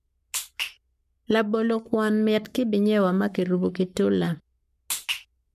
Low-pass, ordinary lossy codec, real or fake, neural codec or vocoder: 14.4 kHz; MP3, 96 kbps; fake; codec, 44.1 kHz, 7.8 kbps, Pupu-Codec